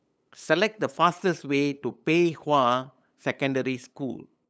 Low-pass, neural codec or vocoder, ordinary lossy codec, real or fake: none; codec, 16 kHz, 8 kbps, FunCodec, trained on LibriTTS, 25 frames a second; none; fake